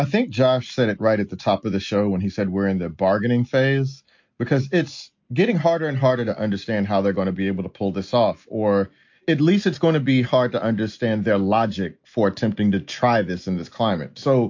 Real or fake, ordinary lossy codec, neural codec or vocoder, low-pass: real; MP3, 48 kbps; none; 7.2 kHz